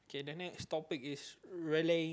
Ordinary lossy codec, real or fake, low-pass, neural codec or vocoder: none; real; none; none